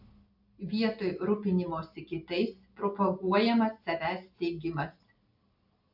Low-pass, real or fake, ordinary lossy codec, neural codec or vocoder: 5.4 kHz; real; AAC, 48 kbps; none